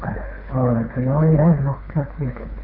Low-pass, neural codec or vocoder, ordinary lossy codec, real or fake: 5.4 kHz; codec, 24 kHz, 3 kbps, HILCodec; none; fake